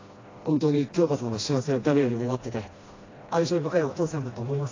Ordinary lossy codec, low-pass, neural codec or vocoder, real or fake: AAC, 32 kbps; 7.2 kHz; codec, 16 kHz, 1 kbps, FreqCodec, smaller model; fake